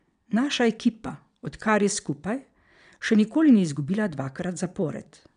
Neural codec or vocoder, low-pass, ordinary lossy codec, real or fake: vocoder, 22.05 kHz, 80 mel bands, WaveNeXt; 9.9 kHz; none; fake